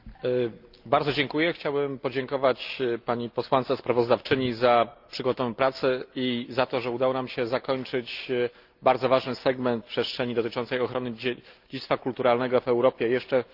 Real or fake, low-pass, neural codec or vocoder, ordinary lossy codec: real; 5.4 kHz; none; Opus, 24 kbps